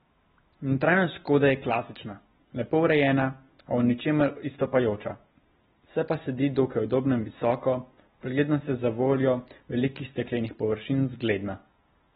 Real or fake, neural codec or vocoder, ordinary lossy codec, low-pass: real; none; AAC, 16 kbps; 19.8 kHz